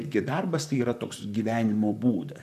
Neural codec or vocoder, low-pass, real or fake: vocoder, 44.1 kHz, 128 mel bands, Pupu-Vocoder; 14.4 kHz; fake